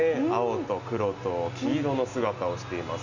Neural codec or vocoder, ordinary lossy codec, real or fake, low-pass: none; none; real; 7.2 kHz